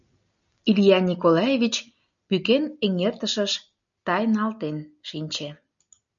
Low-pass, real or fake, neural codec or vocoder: 7.2 kHz; real; none